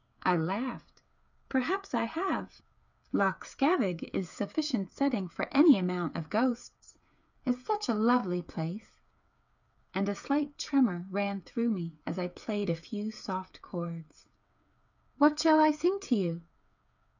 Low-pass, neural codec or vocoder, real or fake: 7.2 kHz; codec, 16 kHz, 8 kbps, FreqCodec, smaller model; fake